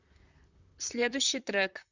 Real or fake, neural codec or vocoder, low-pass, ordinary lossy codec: fake; vocoder, 44.1 kHz, 128 mel bands, Pupu-Vocoder; 7.2 kHz; Opus, 64 kbps